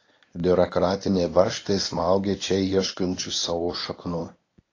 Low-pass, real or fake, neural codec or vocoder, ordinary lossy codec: 7.2 kHz; fake; codec, 24 kHz, 0.9 kbps, WavTokenizer, medium speech release version 1; AAC, 32 kbps